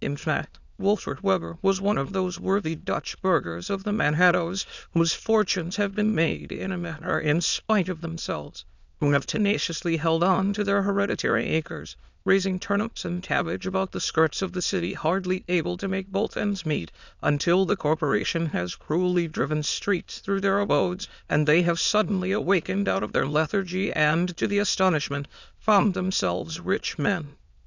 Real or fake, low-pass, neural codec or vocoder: fake; 7.2 kHz; autoencoder, 22.05 kHz, a latent of 192 numbers a frame, VITS, trained on many speakers